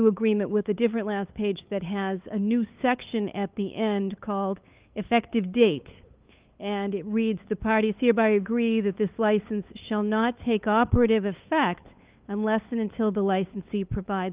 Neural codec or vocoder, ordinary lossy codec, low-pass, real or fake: codec, 16 kHz, 8 kbps, FunCodec, trained on LibriTTS, 25 frames a second; Opus, 32 kbps; 3.6 kHz; fake